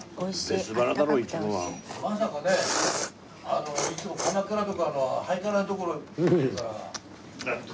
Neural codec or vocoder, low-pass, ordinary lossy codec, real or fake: none; none; none; real